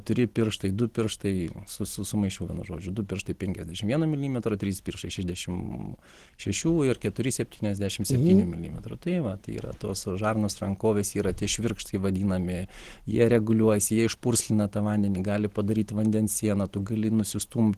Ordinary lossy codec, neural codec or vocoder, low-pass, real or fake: Opus, 16 kbps; none; 14.4 kHz; real